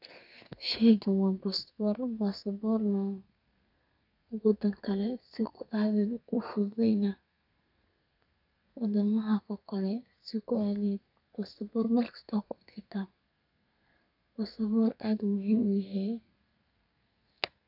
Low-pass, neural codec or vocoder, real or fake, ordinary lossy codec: 5.4 kHz; codec, 32 kHz, 1.9 kbps, SNAC; fake; none